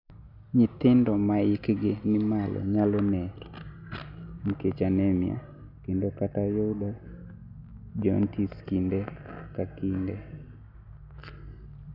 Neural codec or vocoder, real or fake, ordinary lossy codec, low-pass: none; real; none; 5.4 kHz